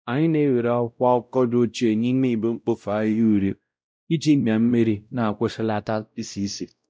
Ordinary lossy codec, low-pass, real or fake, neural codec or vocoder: none; none; fake; codec, 16 kHz, 0.5 kbps, X-Codec, WavLM features, trained on Multilingual LibriSpeech